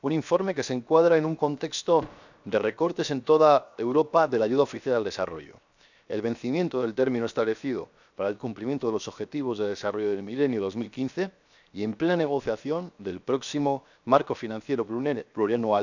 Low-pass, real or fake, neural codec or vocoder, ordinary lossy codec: 7.2 kHz; fake; codec, 16 kHz, 0.7 kbps, FocalCodec; none